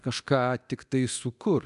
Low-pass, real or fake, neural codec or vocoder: 10.8 kHz; fake; codec, 24 kHz, 0.9 kbps, DualCodec